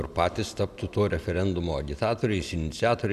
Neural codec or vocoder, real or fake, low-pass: none; real; 14.4 kHz